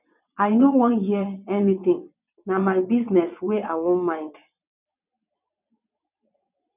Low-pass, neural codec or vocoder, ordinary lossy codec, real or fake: 3.6 kHz; vocoder, 22.05 kHz, 80 mel bands, WaveNeXt; none; fake